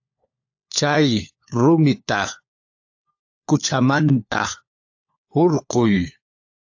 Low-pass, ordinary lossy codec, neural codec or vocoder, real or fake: 7.2 kHz; AAC, 48 kbps; codec, 16 kHz, 4 kbps, FunCodec, trained on LibriTTS, 50 frames a second; fake